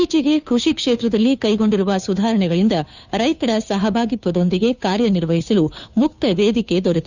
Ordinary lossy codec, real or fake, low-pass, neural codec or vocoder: none; fake; 7.2 kHz; codec, 16 kHz, 2 kbps, FunCodec, trained on Chinese and English, 25 frames a second